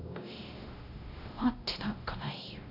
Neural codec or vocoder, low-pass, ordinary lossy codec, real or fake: codec, 16 kHz, 0.5 kbps, X-Codec, WavLM features, trained on Multilingual LibriSpeech; 5.4 kHz; none; fake